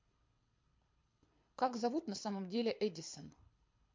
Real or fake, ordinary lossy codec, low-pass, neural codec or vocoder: fake; MP3, 48 kbps; 7.2 kHz; codec, 24 kHz, 6 kbps, HILCodec